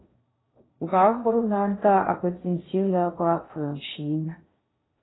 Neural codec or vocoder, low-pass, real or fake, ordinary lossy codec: codec, 16 kHz in and 24 kHz out, 0.6 kbps, FocalCodec, streaming, 2048 codes; 7.2 kHz; fake; AAC, 16 kbps